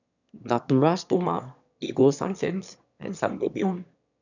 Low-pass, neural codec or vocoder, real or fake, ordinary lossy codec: 7.2 kHz; autoencoder, 22.05 kHz, a latent of 192 numbers a frame, VITS, trained on one speaker; fake; none